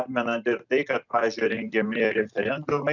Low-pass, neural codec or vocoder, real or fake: 7.2 kHz; none; real